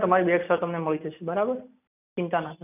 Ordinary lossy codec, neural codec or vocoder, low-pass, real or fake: none; none; 3.6 kHz; real